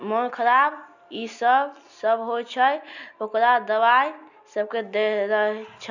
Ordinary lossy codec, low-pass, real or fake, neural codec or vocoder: none; 7.2 kHz; real; none